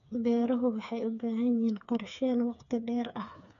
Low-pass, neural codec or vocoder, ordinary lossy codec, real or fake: 7.2 kHz; codec, 16 kHz, 4 kbps, FreqCodec, smaller model; none; fake